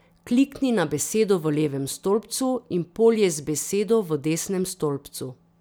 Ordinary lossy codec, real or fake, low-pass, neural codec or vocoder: none; real; none; none